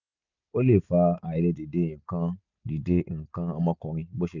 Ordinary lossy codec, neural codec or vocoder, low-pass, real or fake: none; none; 7.2 kHz; real